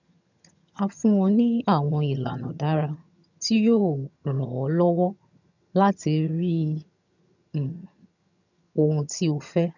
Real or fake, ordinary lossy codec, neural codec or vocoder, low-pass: fake; none; vocoder, 22.05 kHz, 80 mel bands, HiFi-GAN; 7.2 kHz